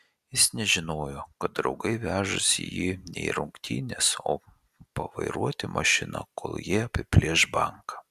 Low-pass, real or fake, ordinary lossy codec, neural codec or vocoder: 14.4 kHz; real; Opus, 64 kbps; none